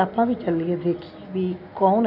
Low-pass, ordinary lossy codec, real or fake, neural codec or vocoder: 5.4 kHz; none; real; none